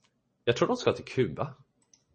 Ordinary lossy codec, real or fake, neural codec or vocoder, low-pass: MP3, 32 kbps; real; none; 10.8 kHz